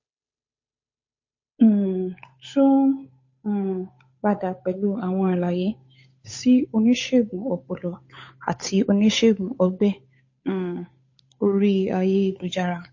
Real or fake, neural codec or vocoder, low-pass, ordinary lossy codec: fake; codec, 16 kHz, 8 kbps, FunCodec, trained on Chinese and English, 25 frames a second; 7.2 kHz; MP3, 32 kbps